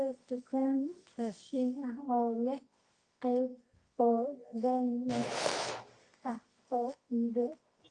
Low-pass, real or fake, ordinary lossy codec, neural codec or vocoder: 10.8 kHz; fake; Opus, 32 kbps; codec, 24 kHz, 0.9 kbps, WavTokenizer, medium music audio release